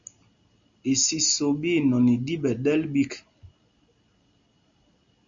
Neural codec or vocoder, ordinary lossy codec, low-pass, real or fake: none; Opus, 64 kbps; 7.2 kHz; real